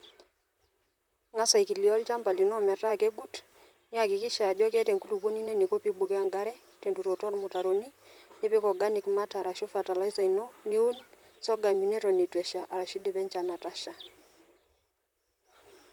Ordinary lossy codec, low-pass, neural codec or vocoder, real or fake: none; 19.8 kHz; vocoder, 44.1 kHz, 128 mel bands, Pupu-Vocoder; fake